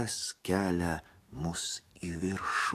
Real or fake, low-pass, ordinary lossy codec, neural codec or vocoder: fake; 14.4 kHz; AAC, 64 kbps; codec, 44.1 kHz, 7.8 kbps, DAC